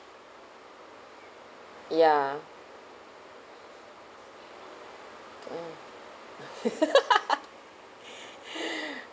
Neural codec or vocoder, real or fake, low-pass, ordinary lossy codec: none; real; none; none